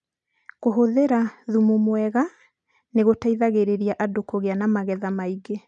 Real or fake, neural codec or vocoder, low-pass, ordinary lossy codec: real; none; 9.9 kHz; none